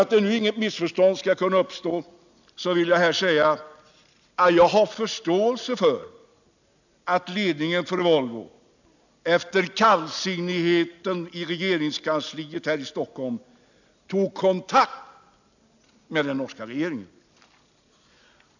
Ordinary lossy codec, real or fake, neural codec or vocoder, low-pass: none; real; none; 7.2 kHz